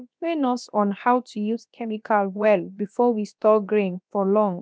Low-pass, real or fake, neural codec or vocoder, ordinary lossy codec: none; fake; codec, 16 kHz, 0.7 kbps, FocalCodec; none